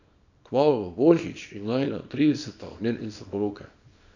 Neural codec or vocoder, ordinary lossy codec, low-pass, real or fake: codec, 24 kHz, 0.9 kbps, WavTokenizer, small release; none; 7.2 kHz; fake